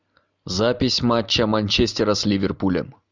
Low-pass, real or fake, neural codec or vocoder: 7.2 kHz; real; none